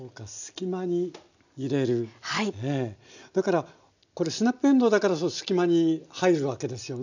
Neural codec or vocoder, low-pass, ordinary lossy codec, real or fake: none; 7.2 kHz; none; real